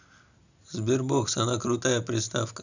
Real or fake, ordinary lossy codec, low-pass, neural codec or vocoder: real; none; 7.2 kHz; none